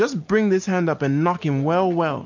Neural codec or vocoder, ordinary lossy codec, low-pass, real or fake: none; MP3, 64 kbps; 7.2 kHz; real